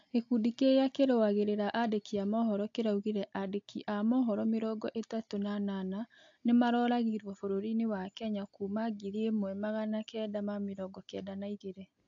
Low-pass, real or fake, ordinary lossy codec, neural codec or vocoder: 7.2 kHz; real; AAC, 48 kbps; none